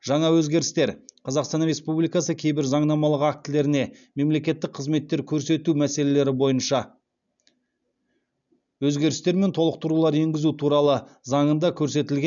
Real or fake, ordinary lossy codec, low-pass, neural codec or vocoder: real; none; 7.2 kHz; none